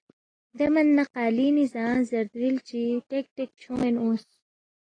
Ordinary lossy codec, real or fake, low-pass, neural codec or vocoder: AAC, 32 kbps; real; 9.9 kHz; none